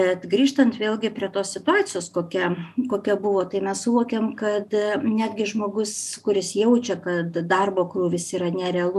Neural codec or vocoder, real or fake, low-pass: none; real; 14.4 kHz